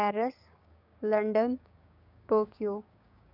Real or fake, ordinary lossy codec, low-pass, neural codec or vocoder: fake; none; 5.4 kHz; codec, 16 kHz, 6 kbps, DAC